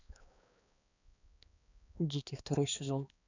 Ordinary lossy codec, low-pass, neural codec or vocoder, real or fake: none; 7.2 kHz; codec, 16 kHz, 2 kbps, X-Codec, HuBERT features, trained on balanced general audio; fake